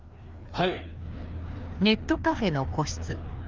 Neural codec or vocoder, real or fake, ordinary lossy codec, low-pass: codec, 16 kHz, 2 kbps, FreqCodec, larger model; fake; Opus, 32 kbps; 7.2 kHz